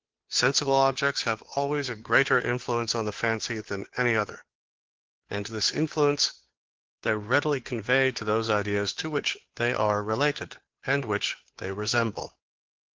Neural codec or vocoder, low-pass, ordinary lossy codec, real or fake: codec, 16 kHz, 2 kbps, FunCodec, trained on Chinese and English, 25 frames a second; 7.2 kHz; Opus, 16 kbps; fake